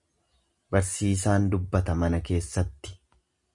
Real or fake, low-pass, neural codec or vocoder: real; 10.8 kHz; none